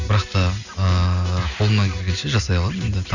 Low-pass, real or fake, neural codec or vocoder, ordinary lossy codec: 7.2 kHz; real; none; none